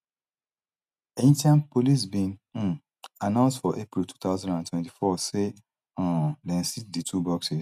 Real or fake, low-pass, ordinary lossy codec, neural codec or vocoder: real; 14.4 kHz; none; none